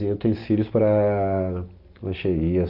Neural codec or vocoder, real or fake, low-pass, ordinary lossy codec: none; real; 5.4 kHz; Opus, 24 kbps